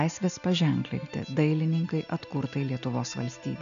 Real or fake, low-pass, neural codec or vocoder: real; 7.2 kHz; none